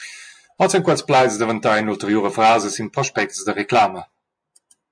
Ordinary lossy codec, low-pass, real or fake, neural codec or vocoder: MP3, 64 kbps; 9.9 kHz; real; none